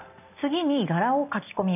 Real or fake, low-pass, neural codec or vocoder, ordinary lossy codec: real; 3.6 kHz; none; none